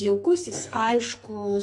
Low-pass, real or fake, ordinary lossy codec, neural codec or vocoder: 10.8 kHz; fake; AAC, 48 kbps; codec, 44.1 kHz, 2.6 kbps, SNAC